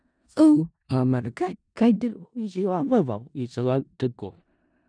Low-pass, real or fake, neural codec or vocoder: 9.9 kHz; fake; codec, 16 kHz in and 24 kHz out, 0.4 kbps, LongCat-Audio-Codec, four codebook decoder